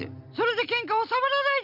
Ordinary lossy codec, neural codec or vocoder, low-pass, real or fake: none; none; 5.4 kHz; real